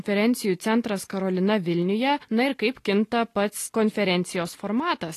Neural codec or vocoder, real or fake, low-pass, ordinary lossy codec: none; real; 14.4 kHz; AAC, 48 kbps